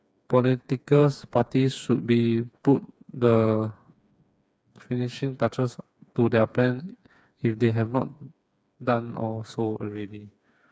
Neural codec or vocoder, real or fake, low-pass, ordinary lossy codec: codec, 16 kHz, 4 kbps, FreqCodec, smaller model; fake; none; none